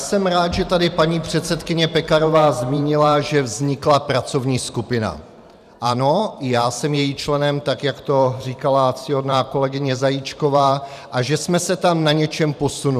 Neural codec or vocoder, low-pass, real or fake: vocoder, 44.1 kHz, 128 mel bands every 512 samples, BigVGAN v2; 14.4 kHz; fake